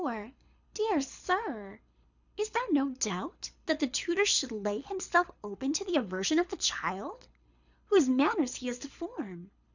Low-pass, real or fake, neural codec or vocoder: 7.2 kHz; fake; codec, 24 kHz, 6 kbps, HILCodec